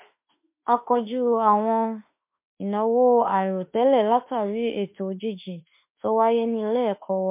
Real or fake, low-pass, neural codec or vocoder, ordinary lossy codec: fake; 3.6 kHz; autoencoder, 48 kHz, 32 numbers a frame, DAC-VAE, trained on Japanese speech; MP3, 32 kbps